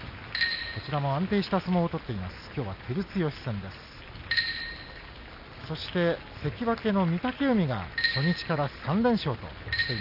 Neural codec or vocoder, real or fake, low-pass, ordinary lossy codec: none; real; 5.4 kHz; none